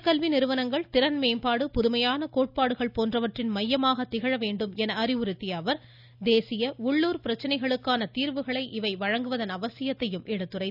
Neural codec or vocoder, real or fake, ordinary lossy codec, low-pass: none; real; none; 5.4 kHz